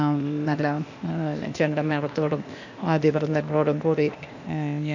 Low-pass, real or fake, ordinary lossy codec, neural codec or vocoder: 7.2 kHz; fake; none; codec, 16 kHz, 0.8 kbps, ZipCodec